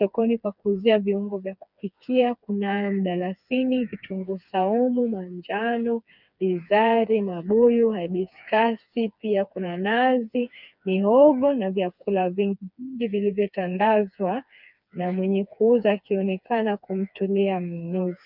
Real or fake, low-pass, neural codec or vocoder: fake; 5.4 kHz; codec, 16 kHz, 4 kbps, FreqCodec, smaller model